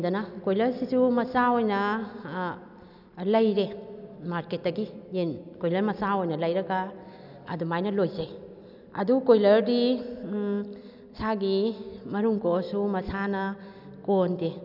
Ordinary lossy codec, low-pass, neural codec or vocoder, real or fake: none; 5.4 kHz; none; real